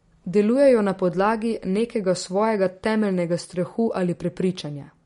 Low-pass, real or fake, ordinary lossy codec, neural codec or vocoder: 10.8 kHz; real; MP3, 48 kbps; none